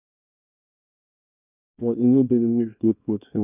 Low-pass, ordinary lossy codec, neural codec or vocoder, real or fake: 3.6 kHz; none; codec, 24 kHz, 0.9 kbps, WavTokenizer, small release; fake